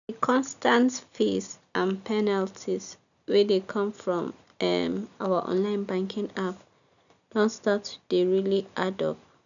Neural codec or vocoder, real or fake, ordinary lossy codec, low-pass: none; real; none; 7.2 kHz